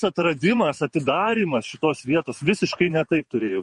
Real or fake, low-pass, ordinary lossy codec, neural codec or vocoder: fake; 14.4 kHz; MP3, 48 kbps; vocoder, 48 kHz, 128 mel bands, Vocos